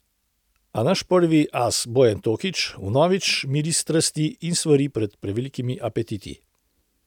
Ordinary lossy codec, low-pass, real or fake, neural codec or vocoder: none; 19.8 kHz; real; none